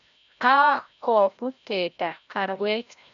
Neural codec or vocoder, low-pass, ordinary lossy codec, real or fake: codec, 16 kHz, 0.5 kbps, FreqCodec, larger model; 7.2 kHz; none; fake